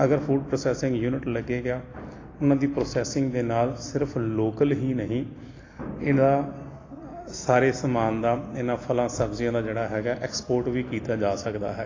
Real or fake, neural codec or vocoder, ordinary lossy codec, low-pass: real; none; AAC, 32 kbps; 7.2 kHz